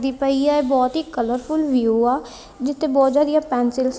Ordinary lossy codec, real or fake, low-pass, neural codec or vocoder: none; real; none; none